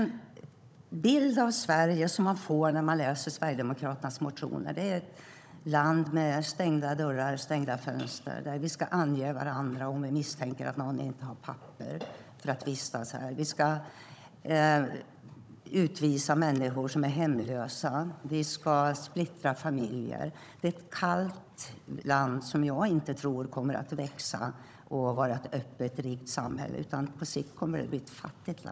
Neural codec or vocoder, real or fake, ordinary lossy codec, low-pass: codec, 16 kHz, 16 kbps, FunCodec, trained on Chinese and English, 50 frames a second; fake; none; none